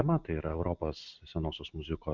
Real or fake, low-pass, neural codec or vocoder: real; 7.2 kHz; none